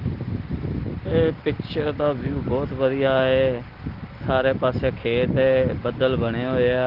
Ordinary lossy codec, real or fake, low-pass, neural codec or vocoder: Opus, 16 kbps; real; 5.4 kHz; none